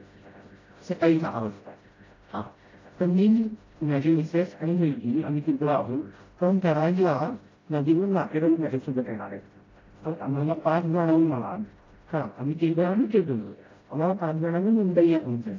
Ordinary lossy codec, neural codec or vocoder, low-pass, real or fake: AAC, 32 kbps; codec, 16 kHz, 0.5 kbps, FreqCodec, smaller model; 7.2 kHz; fake